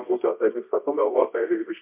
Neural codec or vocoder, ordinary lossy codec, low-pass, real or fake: codec, 24 kHz, 0.9 kbps, WavTokenizer, medium music audio release; MP3, 32 kbps; 3.6 kHz; fake